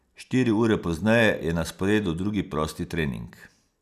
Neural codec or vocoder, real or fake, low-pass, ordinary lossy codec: none; real; 14.4 kHz; none